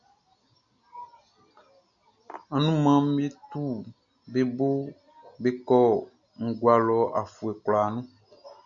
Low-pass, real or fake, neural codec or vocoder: 7.2 kHz; real; none